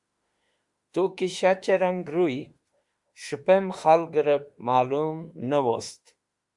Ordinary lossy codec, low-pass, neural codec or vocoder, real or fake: Opus, 64 kbps; 10.8 kHz; autoencoder, 48 kHz, 32 numbers a frame, DAC-VAE, trained on Japanese speech; fake